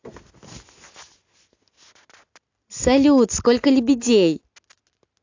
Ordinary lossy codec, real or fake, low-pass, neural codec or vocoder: AAC, 48 kbps; real; 7.2 kHz; none